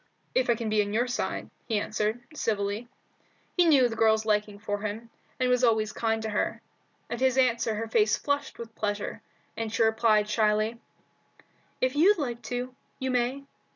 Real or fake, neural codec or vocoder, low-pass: real; none; 7.2 kHz